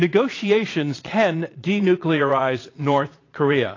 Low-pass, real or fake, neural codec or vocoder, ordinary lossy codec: 7.2 kHz; fake; vocoder, 22.05 kHz, 80 mel bands, Vocos; AAC, 32 kbps